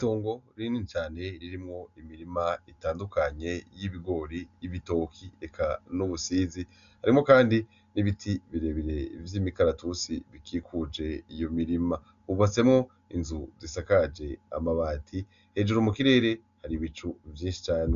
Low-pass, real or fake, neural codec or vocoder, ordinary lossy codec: 7.2 kHz; real; none; MP3, 96 kbps